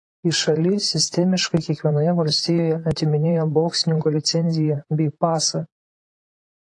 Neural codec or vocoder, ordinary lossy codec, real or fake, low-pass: vocoder, 44.1 kHz, 128 mel bands, Pupu-Vocoder; AAC, 48 kbps; fake; 10.8 kHz